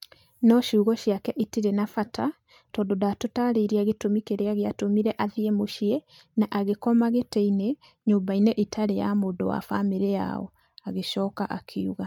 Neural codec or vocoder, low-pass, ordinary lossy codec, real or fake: none; 19.8 kHz; MP3, 96 kbps; real